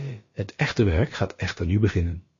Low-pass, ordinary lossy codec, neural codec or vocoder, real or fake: 7.2 kHz; MP3, 32 kbps; codec, 16 kHz, about 1 kbps, DyCAST, with the encoder's durations; fake